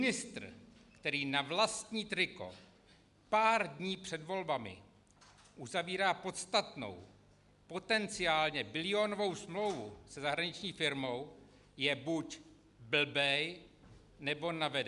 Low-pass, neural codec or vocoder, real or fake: 10.8 kHz; none; real